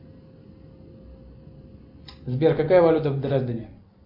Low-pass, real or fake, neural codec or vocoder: 5.4 kHz; real; none